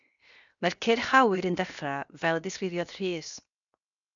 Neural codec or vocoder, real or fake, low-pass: codec, 16 kHz, 0.7 kbps, FocalCodec; fake; 7.2 kHz